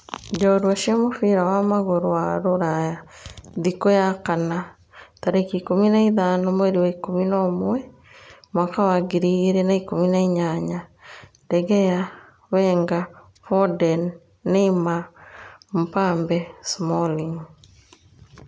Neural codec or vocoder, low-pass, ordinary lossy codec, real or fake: none; none; none; real